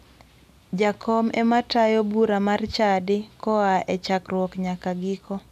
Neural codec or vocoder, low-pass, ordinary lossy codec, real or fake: none; 14.4 kHz; none; real